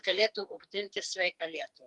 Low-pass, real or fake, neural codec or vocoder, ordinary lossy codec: 10.8 kHz; fake; codec, 44.1 kHz, 2.6 kbps, SNAC; Opus, 32 kbps